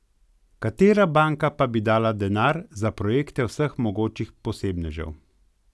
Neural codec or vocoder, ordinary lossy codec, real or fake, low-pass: none; none; real; none